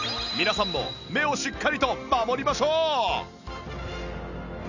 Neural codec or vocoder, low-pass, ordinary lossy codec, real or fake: none; 7.2 kHz; none; real